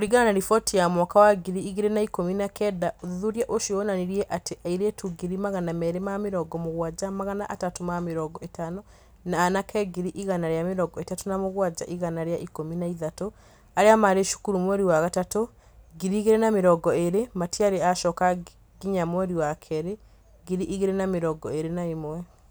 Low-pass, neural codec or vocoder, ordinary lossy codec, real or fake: none; none; none; real